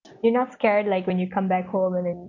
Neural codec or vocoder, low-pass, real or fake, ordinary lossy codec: none; 7.2 kHz; real; none